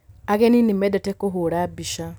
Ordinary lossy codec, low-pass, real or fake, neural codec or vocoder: none; none; real; none